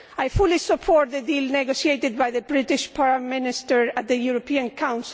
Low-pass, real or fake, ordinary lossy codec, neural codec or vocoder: none; real; none; none